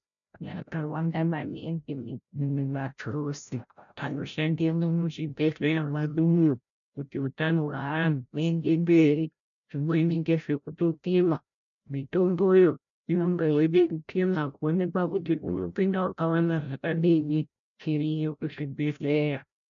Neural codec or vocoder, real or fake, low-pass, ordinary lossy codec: codec, 16 kHz, 0.5 kbps, FreqCodec, larger model; fake; 7.2 kHz; MP3, 96 kbps